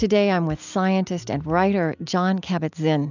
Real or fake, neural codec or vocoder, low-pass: real; none; 7.2 kHz